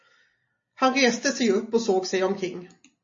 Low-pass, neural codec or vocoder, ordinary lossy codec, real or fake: 7.2 kHz; none; MP3, 32 kbps; real